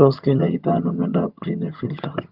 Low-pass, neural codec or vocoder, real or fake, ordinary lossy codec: 5.4 kHz; vocoder, 22.05 kHz, 80 mel bands, HiFi-GAN; fake; Opus, 24 kbps